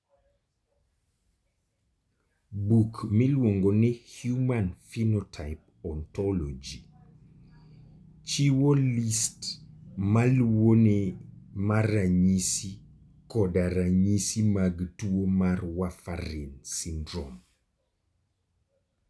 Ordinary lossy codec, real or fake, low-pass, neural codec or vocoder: none; real; none; none